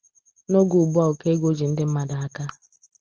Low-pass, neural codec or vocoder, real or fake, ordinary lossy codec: 7.2 kHz; none; real; Opus, 16 kbps